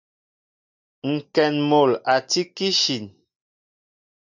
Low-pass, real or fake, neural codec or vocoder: 7.2 kHz; real; none